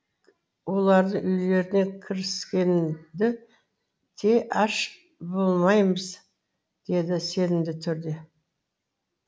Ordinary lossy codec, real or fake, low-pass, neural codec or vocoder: none; real; none; none